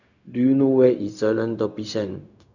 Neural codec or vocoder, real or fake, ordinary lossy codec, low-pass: codec, 16 kHz, 0.4 kbps, LongCat-Audio-Codec; fake; none; 7.2 kHz